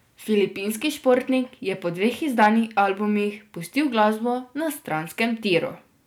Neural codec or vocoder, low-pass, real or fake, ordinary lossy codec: none; none; real; none